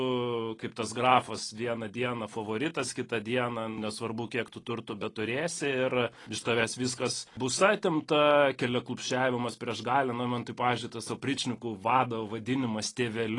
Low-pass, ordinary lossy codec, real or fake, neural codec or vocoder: 10.8 kHz; AAC, 32 kbps; real; none